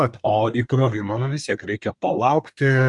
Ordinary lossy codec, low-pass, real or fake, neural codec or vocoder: Opus, 64 kbps; 10.8 kHz; fake; codec, 24 kHz, 1 kbps, SNAC